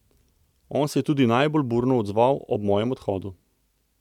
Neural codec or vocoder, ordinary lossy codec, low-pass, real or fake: none; none; 19.8 kHz; real